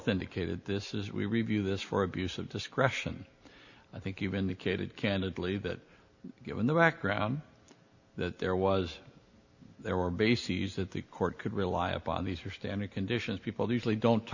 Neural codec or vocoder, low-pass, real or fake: none; 7.2 kHz; real